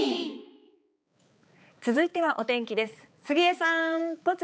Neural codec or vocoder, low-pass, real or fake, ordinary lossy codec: codec, 16 kHz, 4 kbps, X-Codec, HuBERT features, trained on balanced general audio; none; fake; none